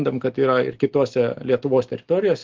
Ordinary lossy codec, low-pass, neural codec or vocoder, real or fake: Opus, 16 kbps; 7.2 kHz; none; real